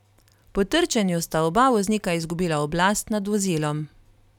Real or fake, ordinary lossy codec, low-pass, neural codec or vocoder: fake; none; 19.8 kHz; vocoder, 44.1 kHz, 128 mel bands every 256 samples, BigVGAN v2